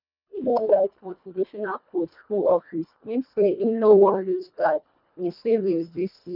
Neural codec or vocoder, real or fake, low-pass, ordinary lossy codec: codec, 24 kHz, 1.5 kbps, HILCodec; fake; 5.4 kHz; none